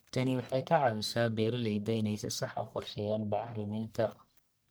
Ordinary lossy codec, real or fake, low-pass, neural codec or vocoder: none; fake; none; codec, 44.1 kHz, 1.7 kbps, Pupu-Codec